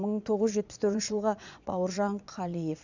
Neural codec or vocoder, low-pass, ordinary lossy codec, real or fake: vocoder, 22.05 kHz, 80 mel bands, Vocos; 7.2 kHz; none; fake